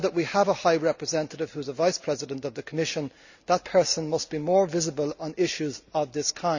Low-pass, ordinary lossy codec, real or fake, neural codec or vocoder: 7.2 kHz; none; real; none